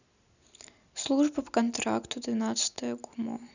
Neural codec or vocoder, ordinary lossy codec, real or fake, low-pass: none; none; real; 7.2 kHz